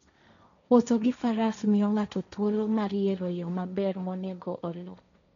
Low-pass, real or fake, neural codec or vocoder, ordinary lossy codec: 7.2 kHz; fake; codec, 16 kHz, 1.1 kbps, Voila-Tokenizer; none